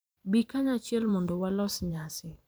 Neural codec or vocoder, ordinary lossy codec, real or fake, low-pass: vocoder, 44.1 kHz, 128 mel bands every 512 samples, BigVGAN v2; none; fake; none